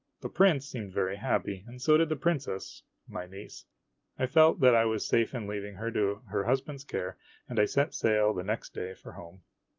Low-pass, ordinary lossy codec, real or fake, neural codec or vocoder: 7.2 kHz; Opus, 24 kbps; real; none